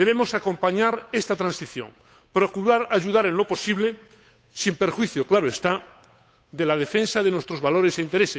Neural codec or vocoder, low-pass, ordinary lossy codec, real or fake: codec, 16 kHz, 8 kbps, FunCodec, trained on Chinese and English, 25 frames a second; none; none; fake